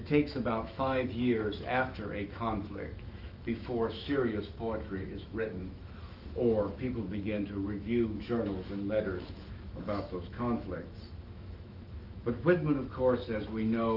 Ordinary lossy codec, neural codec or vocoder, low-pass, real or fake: Opus, 32 kbps; autoencoder, 48 kHz, 128 numbers a frame, DAC-VAE, trained on Japanese speech; 5.4 kHz; fake